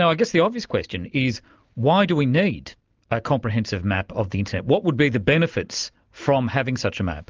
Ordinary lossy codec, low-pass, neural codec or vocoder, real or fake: Opus, 24 kbps; 7.2 kHz; none; real